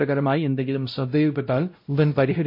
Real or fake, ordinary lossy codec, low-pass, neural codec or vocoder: fake; MP3, 32 kbps; 5.4 kHz; codec, 16 kHz, 0.5 kbps, X-Codec, WavLM features, trained on Multilingual LibriSpeech